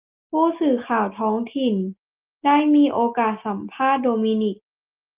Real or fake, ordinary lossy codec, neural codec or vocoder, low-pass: real; Opus, 32 kbps; none; 3.6 kHz